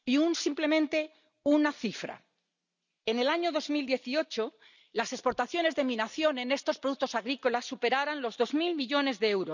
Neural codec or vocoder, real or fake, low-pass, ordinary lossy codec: none; real; 7.2 kHz; none